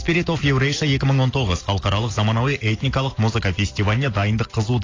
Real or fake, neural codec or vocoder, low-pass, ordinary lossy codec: real; none; 7.2 kHz; AAC, 32 kbps